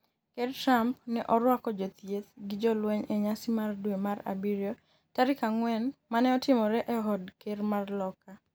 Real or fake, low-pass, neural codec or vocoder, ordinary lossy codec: real; none; none; none